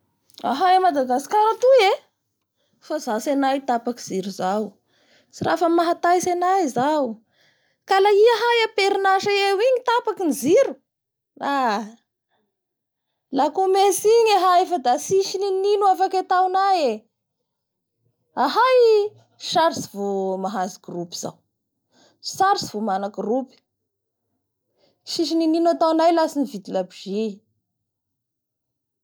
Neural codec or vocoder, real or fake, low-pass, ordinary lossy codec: none; real; none; none